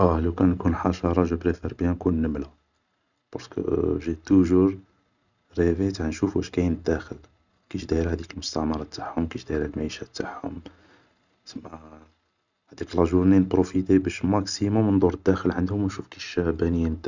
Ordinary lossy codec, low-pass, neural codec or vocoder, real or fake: none; 7.2 kHz; none; real